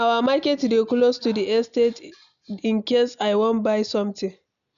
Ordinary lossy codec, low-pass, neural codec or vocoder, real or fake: none; 7.2 kHz; none; real